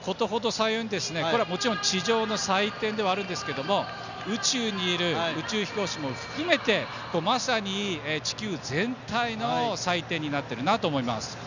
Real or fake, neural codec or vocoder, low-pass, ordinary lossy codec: real; none; 7.2 kHz; none